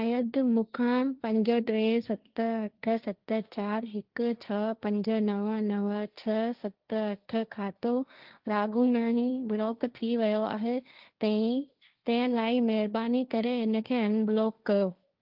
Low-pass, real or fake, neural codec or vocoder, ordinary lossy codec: 5.4 kHz; fake; codec, 16 kHz, 1.1 kbps, Voila-Tokenizer; Opus, 32 kbps